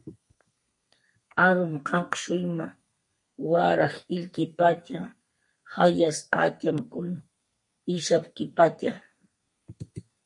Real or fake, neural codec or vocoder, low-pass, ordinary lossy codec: fake; codec, 32 kHz, 1.9 kbps, SNAC; 10.8 kHz; MP3, 48 kbps